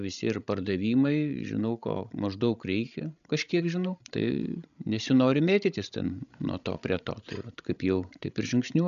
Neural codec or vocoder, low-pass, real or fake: codec, 16 kHz, 16 kbps, FreqCodec, larger model; 7.2 kHz; fake